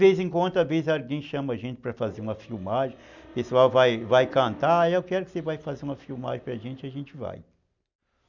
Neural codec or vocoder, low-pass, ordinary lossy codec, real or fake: none; 7.2 kHz; none; real